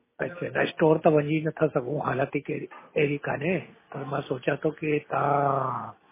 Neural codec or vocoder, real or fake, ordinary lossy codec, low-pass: none; real; MP3, 16 kbps; 3.6 kHz